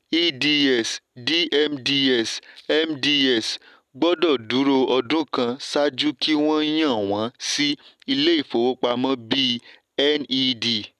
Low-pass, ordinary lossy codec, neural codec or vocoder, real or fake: 14.4 kHz; none; none; real